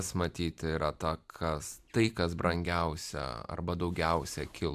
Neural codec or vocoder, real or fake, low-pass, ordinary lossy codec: vocoder, 44.1 kHz, 128 mel bands every 256 samples, BigVGAN v2; fake; 14.4 kHz; AAC, 96 kbps